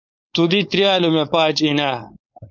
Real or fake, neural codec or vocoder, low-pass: fake; codec, 16 kHz, 4.8 kbps, FACodec; 7.2 kHz